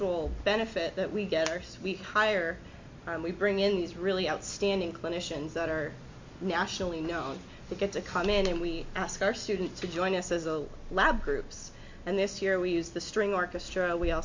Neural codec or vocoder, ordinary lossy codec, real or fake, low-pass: none; MP3, 48 kbps; real; 7.2 kHz